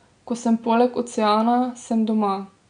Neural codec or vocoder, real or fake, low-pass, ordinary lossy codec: none; real; 9.9 kHz; none